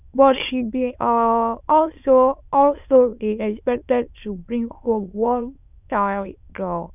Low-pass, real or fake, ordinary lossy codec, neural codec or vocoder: 3.6 kHz; fake; none; autoencoder, 22.05 kHz, a latent of 192 numbers a frame, VITS, trained on many speakers